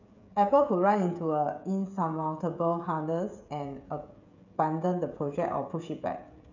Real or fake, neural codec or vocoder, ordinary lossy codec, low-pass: fake; codec, 16 kHz, 16 kbps, FreqCodec, smaller model; none; 7.2 kHz